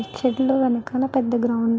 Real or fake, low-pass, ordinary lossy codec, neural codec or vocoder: real; none; none; none